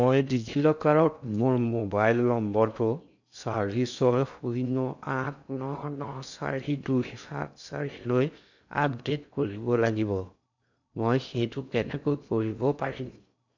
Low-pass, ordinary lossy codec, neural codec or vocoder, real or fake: 7.2 kHz; none; codec, 16 kHz in and 24 kHz out, 0.6 kbps, FocalCodec, streaming, 2048 codes; fake